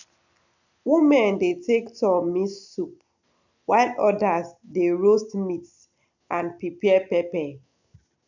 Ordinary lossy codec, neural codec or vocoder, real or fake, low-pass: none; none; real; 7.2 kHz